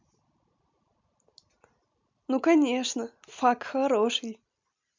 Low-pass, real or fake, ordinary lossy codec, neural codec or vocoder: 7.2 kHz; real; MP3, 64 kbps; none